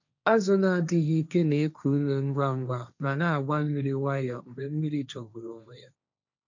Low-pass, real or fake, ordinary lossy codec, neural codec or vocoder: 7.2 kHz; fake; none; codec, 16 kHz, 1.1 kbps, Voila-Tokenizer